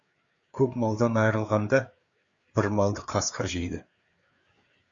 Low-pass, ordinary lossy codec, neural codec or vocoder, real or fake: 7.2 kHz; Opus, 64 kbps; codec, 16 kHz, 4 kbps, FreqCodec, larger model; fake